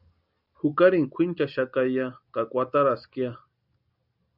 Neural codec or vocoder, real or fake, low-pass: none; real; 5.4 kHz